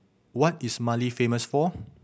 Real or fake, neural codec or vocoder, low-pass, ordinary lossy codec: real; none; none; none